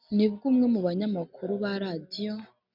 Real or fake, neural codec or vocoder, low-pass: real; none; 5.4 kHz